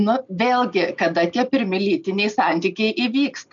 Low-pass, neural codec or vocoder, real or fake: 7.2 kHz; none; real